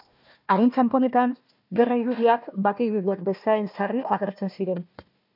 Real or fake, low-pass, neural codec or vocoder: fake; 5.4 kHz; codec, 16 kHz, 1 kbps, FunCodec, trained on Chinese and English, 50 frames a second